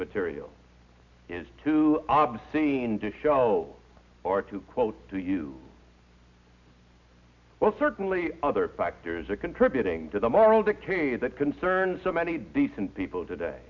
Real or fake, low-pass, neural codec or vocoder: real; 7.2 kHz; none